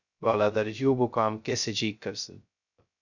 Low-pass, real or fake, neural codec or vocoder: 7.2 kHz; fake; codec, 16 kHz, 0.2 kbps, FocalCodec